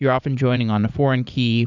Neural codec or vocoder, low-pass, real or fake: vocoder, 44.1 kHz, 80 mel bands, Vocos; 7.2 kHz; fake